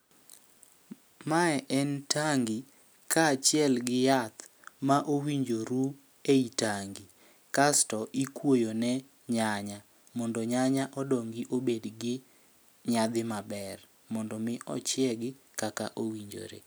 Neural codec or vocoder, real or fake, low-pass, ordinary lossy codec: none; real; none; none